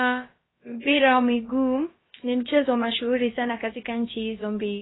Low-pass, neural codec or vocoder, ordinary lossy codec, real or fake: 7.2 kHz; codec, 16 kHz, about 1 kbps, DyCAST, with the encoder's durations; AAC, 16 kbps; fake